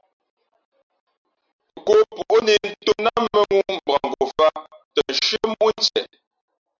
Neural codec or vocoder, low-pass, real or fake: none; 7.2 kHz; real